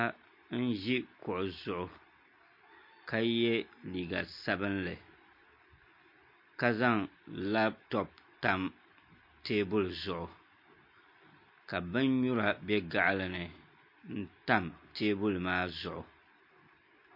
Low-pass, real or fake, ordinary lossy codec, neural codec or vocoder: 5.4 kHz; real; MP3, 32 kbps; none